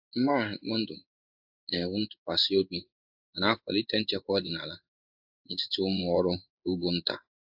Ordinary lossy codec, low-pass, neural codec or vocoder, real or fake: AAC, 48 kbps; 5.4 kHz; codec, 16 kHz in and 24 kHz out, 1 kbps, XY-Tokenizer; fake